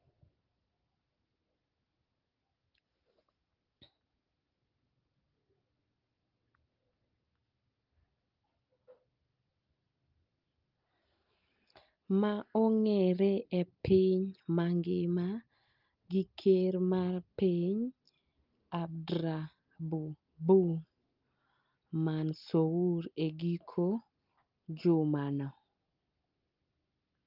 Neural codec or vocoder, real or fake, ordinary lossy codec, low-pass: none; real; Opus, 32 kbps; 5.4 kHz